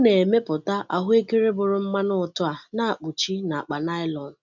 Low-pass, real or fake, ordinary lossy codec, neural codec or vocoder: 7.2 kHz; real; none; none